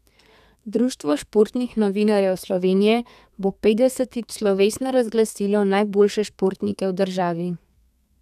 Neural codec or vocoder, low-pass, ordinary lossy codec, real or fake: codec, 32 kHz, 1.9 kbps, SNAC; 14.4 kHz; none; fake